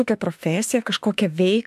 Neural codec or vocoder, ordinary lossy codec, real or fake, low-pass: autoencoder, 48 kHz, 32 numbers a frame, DAC-VAE, trained on Japanese speech; AAC, 96 kbps; fake; 14.4 kHz